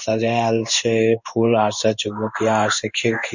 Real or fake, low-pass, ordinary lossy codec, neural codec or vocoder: fake; 7.2 kHz; none; codec, 16 kHz in and 24 kHz out, 1 kbps, XY-Tokenizer